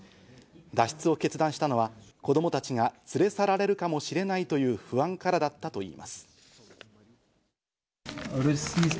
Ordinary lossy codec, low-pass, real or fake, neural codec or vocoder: none; none; real; none